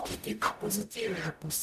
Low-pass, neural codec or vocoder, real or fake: 14.4 kHz; codec, 44.1 kHz, 0.9 kbps, DAC; fake